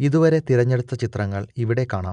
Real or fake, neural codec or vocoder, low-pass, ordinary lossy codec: real; none; 9.9 kHz; none